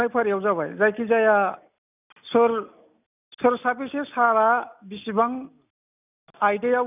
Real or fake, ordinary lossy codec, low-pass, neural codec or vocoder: real; none; 3.6 kHz; none